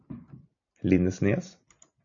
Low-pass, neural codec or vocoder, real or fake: 7.2 kHz; none; real